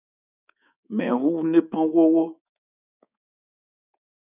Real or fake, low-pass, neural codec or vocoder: fake; 3.6 kHz; codec, 24 kHz, 3.1 kbps, DualCodec